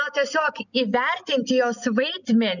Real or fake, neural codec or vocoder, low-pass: real; none; 7.2 kHz